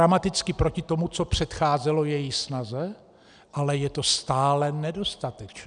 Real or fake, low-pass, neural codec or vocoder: real; 9.9 kHz; none